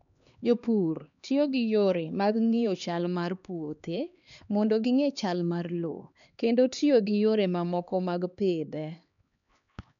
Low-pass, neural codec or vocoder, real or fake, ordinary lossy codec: 7.2 kHz; codec, 16 kHz, 2 kbps, X-Codec, HuBERT features, trained on LibriSpeech; fake; none